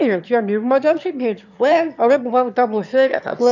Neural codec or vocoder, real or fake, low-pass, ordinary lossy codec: autoencoder, 22.05 kHz, a latent of 192 numbers a frame, VITS, trained on one speaker; fake; 7.2 kHz; none